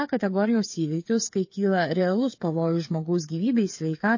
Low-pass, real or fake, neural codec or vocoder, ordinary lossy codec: 7.2 kHz; fake; codec, 16 kHz, 8 kbps, FreqCodec, smaller model; MP3, 32 kbps